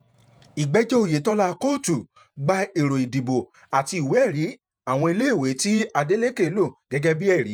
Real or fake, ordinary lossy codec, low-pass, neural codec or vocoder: fake; none; none; vocoder, 48 kHz, 128 mel bands, Vocos